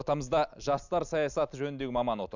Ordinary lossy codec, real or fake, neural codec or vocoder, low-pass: none; real; none; 7.2 kHz